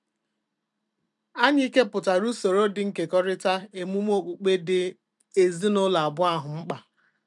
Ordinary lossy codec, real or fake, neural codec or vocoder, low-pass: none; fake; vocoder, 44.1 kHz, 128 mel bands every 256 samples, BigVGAN v2; 10.8 kHz